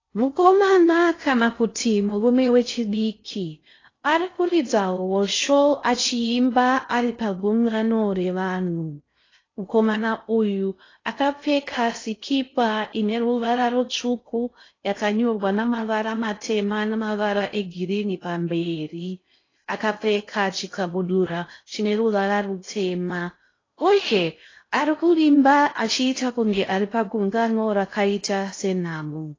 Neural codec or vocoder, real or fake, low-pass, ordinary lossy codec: codec, 16 kHz in and 24 kHz out, 0.6 kbps, FocalCodec, streaming, 4096 codes; fake; 7.2 kHz; AAC, 32 kbps